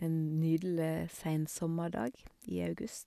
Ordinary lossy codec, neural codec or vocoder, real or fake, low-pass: none; none; real; 14.4 kHz